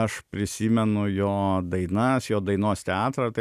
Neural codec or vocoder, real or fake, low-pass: none; real; 14.4 kHz